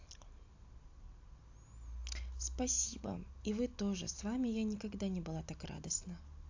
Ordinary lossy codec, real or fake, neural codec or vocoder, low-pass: AAC, 48 kbps; real; none; 7.2 kHz